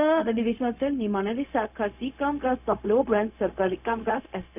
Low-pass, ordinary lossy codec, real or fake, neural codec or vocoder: 3.6 kHz; none; fake; codec, 16 kHz, 0.4 kbps, LongCat-Audio-Codec